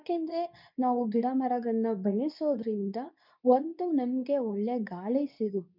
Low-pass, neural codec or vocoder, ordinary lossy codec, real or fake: 5.4 kHz; codec, 24 kHz, 0.9 kbps, WavTokenizer, medium speech release version 2; none; fake